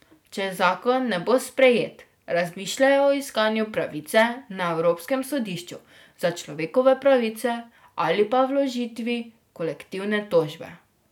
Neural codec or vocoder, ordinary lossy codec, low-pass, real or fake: none; none; 19.8 kHz; real